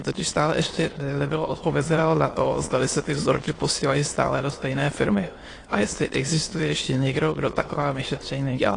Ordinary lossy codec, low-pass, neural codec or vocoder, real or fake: AAC, 32 kbps; 9.9 kHz; autoencoder, 22.05 kHz, a latent of 192 numbers a frame, VITS, trained on many speakers; fake